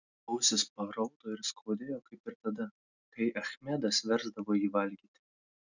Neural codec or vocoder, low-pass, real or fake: none; 7.2 kHz; real